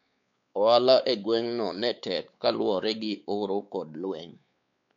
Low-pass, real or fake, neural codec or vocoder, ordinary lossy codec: 7.2 kHz; fake; codec, 16 kHz, 4 kbps, X-Codec, WavLM features, trained on Multilingual LibriSpeech; none